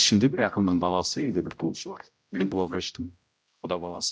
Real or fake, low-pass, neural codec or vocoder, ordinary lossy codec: fake; none; codec, 16 kHz, 0.5 kbps, X-Codec, HuBERT features, trained on general audio; none